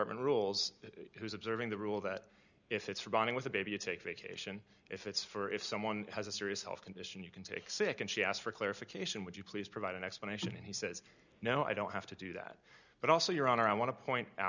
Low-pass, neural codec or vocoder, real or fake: 7.2 kHz; none; real